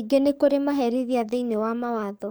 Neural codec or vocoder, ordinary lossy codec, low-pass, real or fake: codec, 44.1 kHz, 7.8 kbps, DAC; none; none; fake